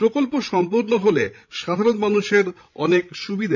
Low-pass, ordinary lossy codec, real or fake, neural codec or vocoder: 7.2 kHz; none; fake; codec, 16 kHz, 16 kbps, FreqCodec, larger model